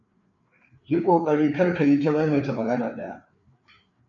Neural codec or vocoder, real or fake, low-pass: codec, 16 kHz, 4 kbps, FreqCodec, larger model; fake; 7.2 kHz